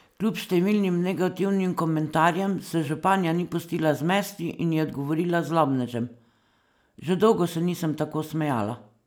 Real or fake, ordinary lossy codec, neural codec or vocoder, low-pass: real; none; none; none